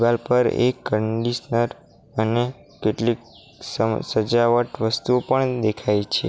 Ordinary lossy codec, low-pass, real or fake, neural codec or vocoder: none; none; real; none